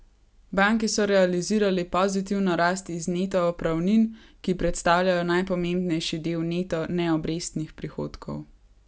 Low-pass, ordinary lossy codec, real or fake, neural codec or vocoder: none; none; real; none